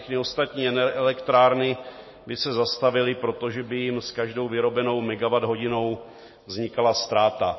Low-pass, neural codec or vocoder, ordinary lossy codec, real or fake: 7.2 kHz; none; MP3, 24 kbps; real